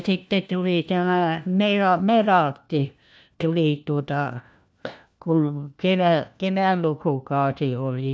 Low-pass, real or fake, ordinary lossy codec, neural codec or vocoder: none; fake; none; codec, 16 kHz, 1 kbps, FunCodec, trained on LibriTTS, 50 frames a second